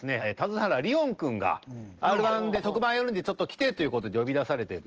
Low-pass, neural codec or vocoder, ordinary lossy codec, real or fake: 7.2 kHz; none; Opus, 16 kbps; real